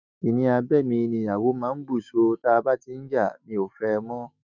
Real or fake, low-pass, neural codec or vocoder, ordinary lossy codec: fake; 7.2 kHz; autoencoder, 48 kHz, 128 numbers a frame, DAC-VAE, trained on Japanese speech; none